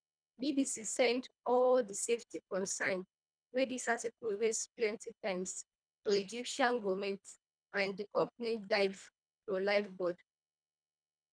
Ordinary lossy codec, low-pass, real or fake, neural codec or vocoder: none; 9.9 kHz; fake; codec, 24 kHz, 1.5 kbps, HILCodec